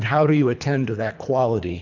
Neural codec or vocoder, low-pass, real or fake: codec, 24 kHz, 3 kbps, HILCodec; 7.2 kHz; fake